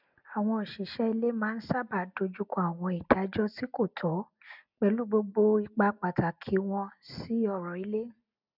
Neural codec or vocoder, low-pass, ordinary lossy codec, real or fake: none; 5.4 kHz; none; real